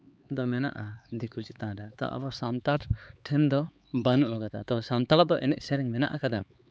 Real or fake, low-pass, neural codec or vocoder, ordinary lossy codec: fake; none; codec, 16 kHz, 4 kbps, X-Codec, HuBERT features, trained on LibriSpeech; none